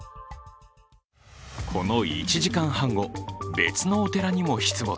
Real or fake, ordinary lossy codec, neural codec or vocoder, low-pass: real; none; none; none